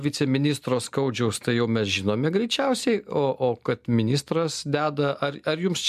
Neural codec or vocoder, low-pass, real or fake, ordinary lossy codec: none; 14.4 kHz; real; MP3, 96 kbps